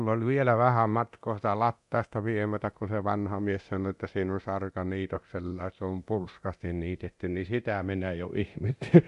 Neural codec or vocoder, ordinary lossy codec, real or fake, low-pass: codec, 24 kHz, 0.9 kbps, DualCodec; none; fake; 10.8 kHz